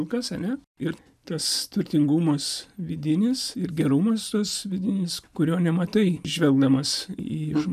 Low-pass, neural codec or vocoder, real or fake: 14.4 kHz; none; real